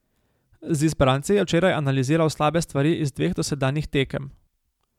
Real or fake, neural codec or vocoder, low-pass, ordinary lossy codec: real; none; 19.8 kHz; MP3, 96 kbps